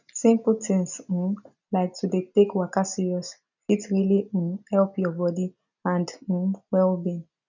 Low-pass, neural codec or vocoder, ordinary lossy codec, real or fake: 7.2 kHz; none; none; real